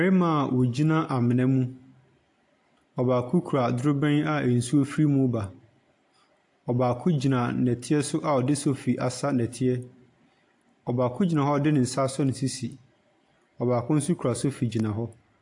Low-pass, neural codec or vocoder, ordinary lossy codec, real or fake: 10.8 kHz; none; AAC, 64 kbps; real